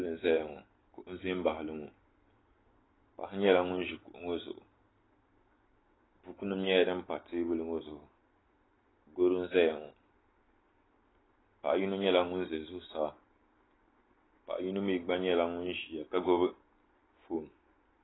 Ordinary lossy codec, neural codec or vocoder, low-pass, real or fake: AAC, 16 kbps; none; 7.2 kHz; real